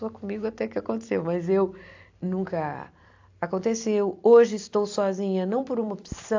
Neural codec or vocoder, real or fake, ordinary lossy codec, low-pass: vocoder, 44.1 kHz, 128 mel bands every 256 samples, BigVGAN v2; fake; AAC, 48 kbps; 7.2 kHz